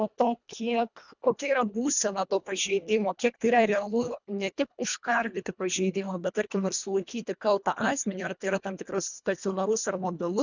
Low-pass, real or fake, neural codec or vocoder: 7.2 kHz; fake; codec, 24 kHz, 1.5 kbps, HILCodec